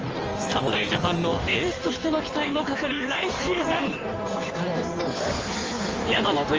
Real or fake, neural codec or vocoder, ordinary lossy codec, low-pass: fake; codec, 16 kHz in and 24 kHz out, 1.1 kbps, FireRedTTS-2 codec; Opus, 24 kbps; 7.2 kHz